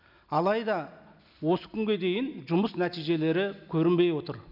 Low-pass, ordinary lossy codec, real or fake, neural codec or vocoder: 5.4 kHz; none; real; none